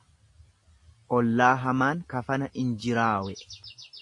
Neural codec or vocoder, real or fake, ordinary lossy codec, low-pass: none; real; AAC, 48 kbps; 10.8 kHz